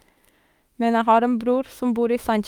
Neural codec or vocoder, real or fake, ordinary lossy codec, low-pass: autoencoder, 48 kHz, 32 numbers a frame, DAC-VAE, trained on Japanese speech; fake; Opus, 32 kbps; 19.8 kHz